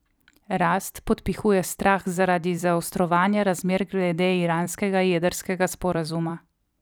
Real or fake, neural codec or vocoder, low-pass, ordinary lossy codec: fake; vocoder, 44.1 kHz, 128 mel bands every 256 samples, BigVGAN v2; none; none